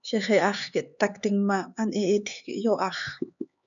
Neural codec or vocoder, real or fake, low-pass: codec, 16 kHz, 4 kbps, X-Codec, HuBERT features, trained on LibriSpeech; fake; 7.2 kHz